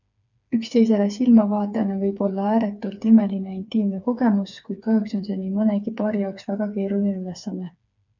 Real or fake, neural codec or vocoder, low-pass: fake; codec, 16 kHz, 4 kbps, FreqCodec, smaller model; 7.2 kHz